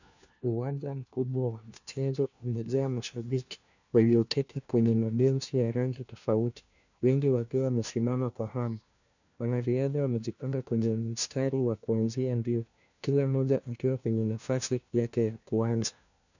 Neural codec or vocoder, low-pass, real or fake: codec, 16 kHz, 1 kbps, FunCodec, trained on LibriTTS, 50 frames a second; 7.2 kHz; fake